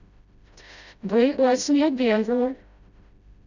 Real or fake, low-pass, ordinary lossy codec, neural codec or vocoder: fake; 7.2 kHz; none; codec, 16 kHz, 0.5 kbps, FreqCodec, smaller model